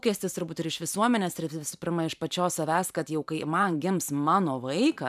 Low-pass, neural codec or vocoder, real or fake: 14.4 kHz; vocoder, 44.1 kHz, 128 mel bands every 512 samples, BigVGAN v2; fake